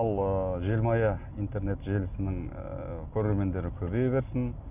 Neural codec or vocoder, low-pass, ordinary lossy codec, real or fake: none; 3.6 kHz; none; real